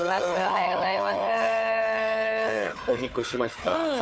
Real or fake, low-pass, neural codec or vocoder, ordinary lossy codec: fake; none; codec, 16 kHz, 4 kbps, FunCodec, trained on Chinese and English, 50 frames a second; none